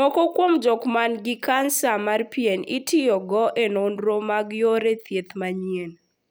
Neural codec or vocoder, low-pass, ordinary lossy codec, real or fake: none; none; none; real